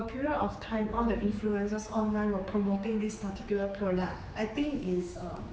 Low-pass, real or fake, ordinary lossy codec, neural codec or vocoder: none; fake; none; codec, 16 kHz, 4 kbps, X-Codec, HuBERT features, trained on general audio